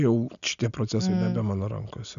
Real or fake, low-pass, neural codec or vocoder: real; 7.2 kHz; none